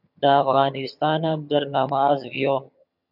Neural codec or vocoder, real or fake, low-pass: vocoder, 22.05 kHz, 80 mel bands, HiFi-GAN; fake; 5.4 kHz